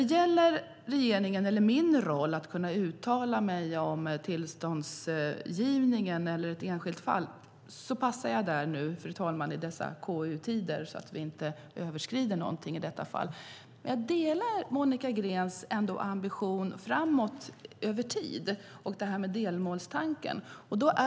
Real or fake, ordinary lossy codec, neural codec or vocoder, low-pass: real; none; none; none